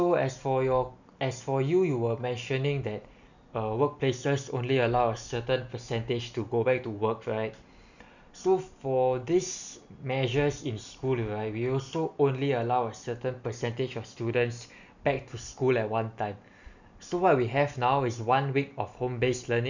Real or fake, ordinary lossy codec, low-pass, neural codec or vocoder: real; none; 7.2 kHz; none